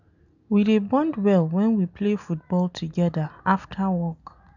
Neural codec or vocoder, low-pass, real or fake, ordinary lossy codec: none; 7.2 kHz; real; none